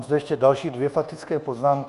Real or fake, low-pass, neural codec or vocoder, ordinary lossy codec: fake; 10.8 kHz; codec, 24 kHz, 1.2 kbps, DualCodec; Opus, 64 kbps